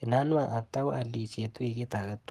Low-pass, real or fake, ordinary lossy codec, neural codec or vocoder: 14.4 kHz; fake; Opus, 32 kbps; codec, 44.1 kHz, 7.8 kbps, Pupu-Codec